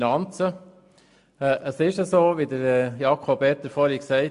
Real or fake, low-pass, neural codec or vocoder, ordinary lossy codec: real; 10.8 kHz; none; AAC, 64 kbps